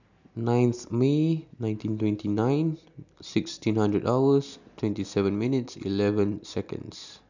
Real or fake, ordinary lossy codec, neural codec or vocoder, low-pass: real; none; none; 7.2 kHz